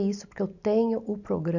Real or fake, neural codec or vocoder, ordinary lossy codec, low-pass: real; none; none; 7.2 kHz